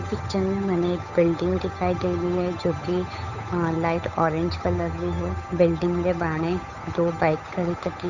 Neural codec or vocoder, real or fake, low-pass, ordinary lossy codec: codec, 16 kHz, 8 kbps, FunCodec, trained on Chinese and English, 25 frames a second; fake; 7.2 kHz; none